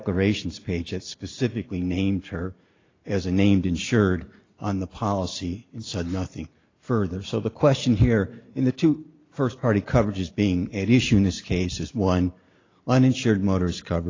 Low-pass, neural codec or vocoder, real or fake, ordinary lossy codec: 7.2 kHz; none; real; AAC, 32 kbps